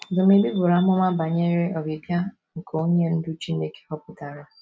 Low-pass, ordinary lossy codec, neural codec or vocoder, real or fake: none; none; none; real